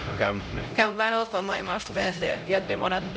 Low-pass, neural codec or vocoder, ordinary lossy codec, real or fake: none; codec, 16 kHz, 0.5 kbps, X-Codec, HuBERT features, trained on LibriSpeech; none; fake